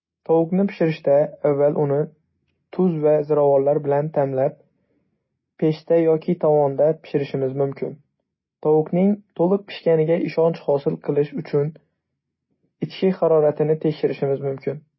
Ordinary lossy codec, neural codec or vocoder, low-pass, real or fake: MP3, 24 kbps; none; 7.2 kHz; real